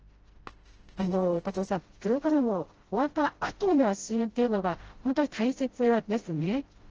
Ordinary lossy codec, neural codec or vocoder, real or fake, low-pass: Opus, 16 kbps; codec, 16 kHz, 0.5 kbps, FreqCodec, smaller model; fake; 7.2 kHz